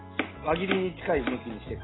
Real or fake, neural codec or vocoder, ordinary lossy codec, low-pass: real; none; AAC, 16 kbps; 7.2 kHz